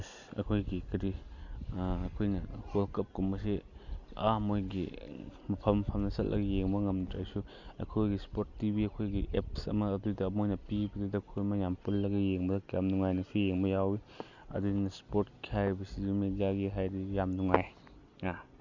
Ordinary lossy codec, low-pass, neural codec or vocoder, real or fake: none; 7.2 kHz; none; real